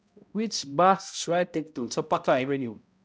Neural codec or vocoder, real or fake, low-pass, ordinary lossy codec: codec, 16 kHz, 0.5 kbps, X-Codec, HuBERT features, trained on balanced general audio; fake; none; none